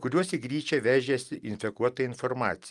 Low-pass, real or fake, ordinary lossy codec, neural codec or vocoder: 10.8 kHz; real; Opus, 32 kbps; none